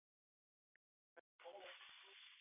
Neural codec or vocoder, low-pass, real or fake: none; 3.6 kHz; real